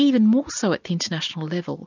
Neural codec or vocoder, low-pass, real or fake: none; 7.2 kHz; real